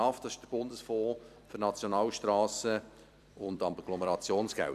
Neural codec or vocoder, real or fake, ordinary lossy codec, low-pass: vocoder, 44.1 kHz, 128 mel bands every 256 samples, BigVGAN v2; fake; none; 14.4 kHz